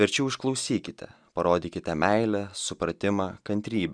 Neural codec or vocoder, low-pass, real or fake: none; 9.9 kHz; real